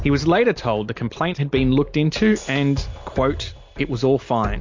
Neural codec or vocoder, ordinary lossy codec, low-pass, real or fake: none; MP3, 48 kbps; 7.2 kHz; real